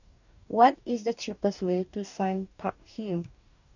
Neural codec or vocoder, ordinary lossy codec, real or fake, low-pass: codec, 44.1 kHz, 2.6 kbps, DAC; none; fake; 7.2 kHz